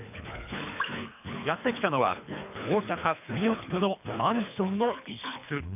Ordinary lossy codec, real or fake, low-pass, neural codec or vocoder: none; fake; 3.6 kHz; codec, 24 kHz, 3 kbps, HILCodec